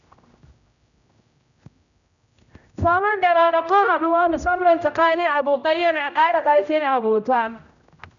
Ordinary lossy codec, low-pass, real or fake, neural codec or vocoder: none; 7.2 kHz; fake; codec, 16 kHz, 0.5 kbps, X-Codec, HuBERT features, trained on general audio